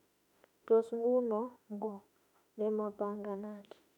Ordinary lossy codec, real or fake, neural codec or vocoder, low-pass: none; fake; autoencoder, 48 kHz, 32 numbers a frame, DAC-VAE, trained on Japanese speech; 19.8 kHz